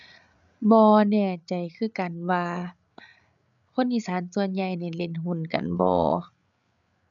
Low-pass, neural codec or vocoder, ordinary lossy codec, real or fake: 7.2 kHz; codec, 16 kHz, 8 kbps, FreqCodec, larger model; none; fake